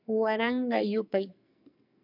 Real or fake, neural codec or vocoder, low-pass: fake; codec, 44.1 kHz, 2.6 kbps, SNAC; 5.4 kHz